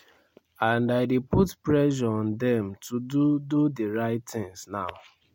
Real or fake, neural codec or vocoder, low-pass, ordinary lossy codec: real; none; 19.8 kHz; MP3, 64 kbps